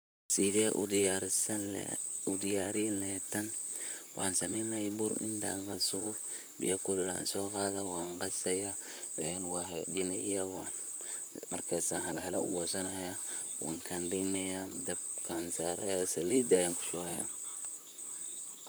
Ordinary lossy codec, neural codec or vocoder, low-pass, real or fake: none; vocoder, 44.1 kHz, 128 mel bands, Pupu-Vocoder; none; fake